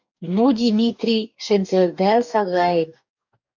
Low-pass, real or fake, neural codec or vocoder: 7.2 kHz; fake; codec, 44.1 kHz, 2.6 kbps, DAC